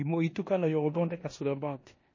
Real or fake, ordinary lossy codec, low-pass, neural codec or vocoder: fake; MP3, 32 kbps; 7.2 kHz; codec, 16 kHz in and 24 kHz out, 0.9 kbps, LongCat-Audio-Codec, four codebook decoder